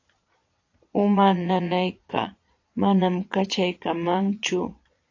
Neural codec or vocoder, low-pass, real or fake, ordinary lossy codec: vocoder, 22.05 kHz, 80 mel bands, Vocos; 7.2 kHz; fake; AAC, 48 kbps